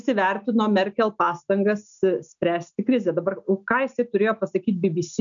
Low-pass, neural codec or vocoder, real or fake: 7.2 kHz; none; real